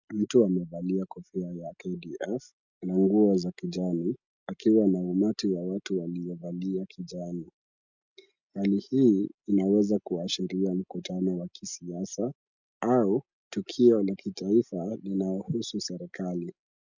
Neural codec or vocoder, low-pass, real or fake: none; 7.2 kHz; real